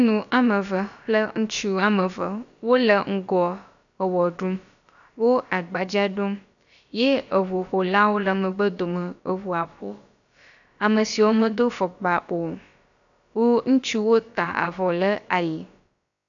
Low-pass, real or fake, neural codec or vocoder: 7.2 kHz; fake; codec, 16 kHz, about 1 kbps, DyCAST, with the encoder's durations